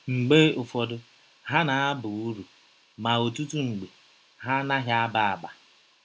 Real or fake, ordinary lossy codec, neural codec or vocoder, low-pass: real; none; none; none